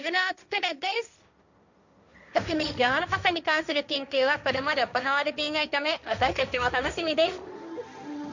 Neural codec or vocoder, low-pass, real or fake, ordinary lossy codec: codec, 16 kHz, 1.1 kbps, Voila-Tokenizer; 7.2 kHz; fake; none